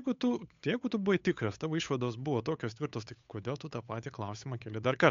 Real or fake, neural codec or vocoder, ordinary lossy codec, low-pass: fake; codec, 16 kHz, 8 kbps, FunCodec, trained on Chinese and English, 25 frames a second; MP3, 64 kbps; 7.2 kHz